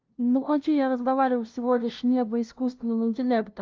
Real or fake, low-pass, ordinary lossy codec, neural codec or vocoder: fake; 7.2 kHz; Opus, 32 kbps; codec, 16 kHz, 0.5 kbps, FunCodec, trained on LibriTTS, 25 frames a second